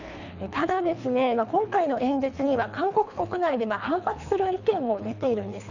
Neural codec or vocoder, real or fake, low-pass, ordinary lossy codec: codec, 24 kHz, 3 kbps, HILCodec; fake; 7.2 kHz; none